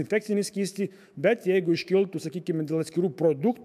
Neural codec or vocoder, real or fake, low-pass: autoencoder, 48 kHz, 128 numbers a frame, DAC-VAE, trained on Japanese speech; fake; 14.4 kHz